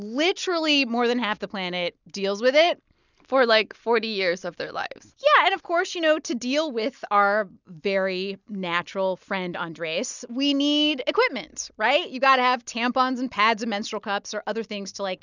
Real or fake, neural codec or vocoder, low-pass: real; none; 7.2 kHz